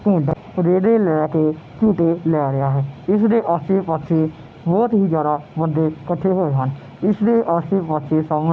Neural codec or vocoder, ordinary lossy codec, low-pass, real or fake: none; none; none; real